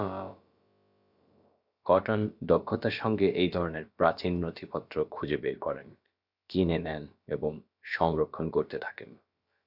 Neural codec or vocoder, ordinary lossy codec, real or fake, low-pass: codec, 16 kHz, about 1 kbps, DyCAST, with the encoder's durations; Opus, 64 kbps; fake; 5.4 kHz